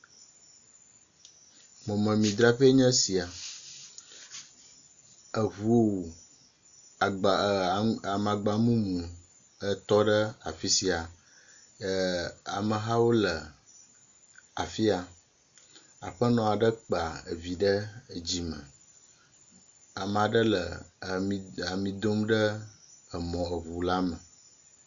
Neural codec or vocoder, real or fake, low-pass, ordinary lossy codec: none; real; 7.2 kHz; AAC, 64 kbps